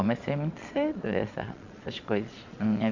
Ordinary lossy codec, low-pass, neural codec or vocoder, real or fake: Opus, 64 kbps; 7.2 kHz; vocoder, 22.05 kHz, 80 mel bands, Vocos; fake